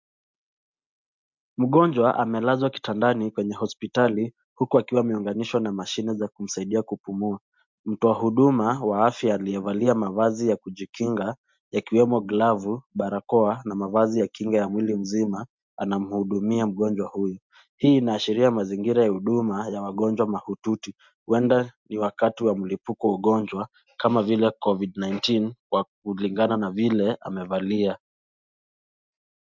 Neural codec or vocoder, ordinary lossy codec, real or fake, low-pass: none; MP3, 64 kbps; real; 7.2 kHz